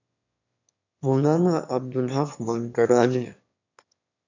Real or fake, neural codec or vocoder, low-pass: fake; autoencoder, 22.05 kHz, a latent of 192 numbers a frame, VITS, trained on one speaker; 7.2 kHz